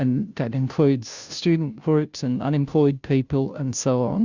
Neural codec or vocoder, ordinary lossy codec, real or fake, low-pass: codec, 16 kHz, 0.5 kbps, FunCodec, trained on Chinese and English, 25 frames a second; Opus, 64 kbps; fake; 7.2 kHz